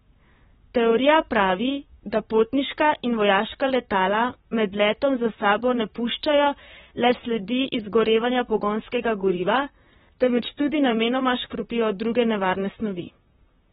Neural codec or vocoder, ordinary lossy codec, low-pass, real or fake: vocoder, 44.1 kHz, 128 mel bands, Pupu-Vocoder; AAC, 16 kbps; 19.8 kHz; fake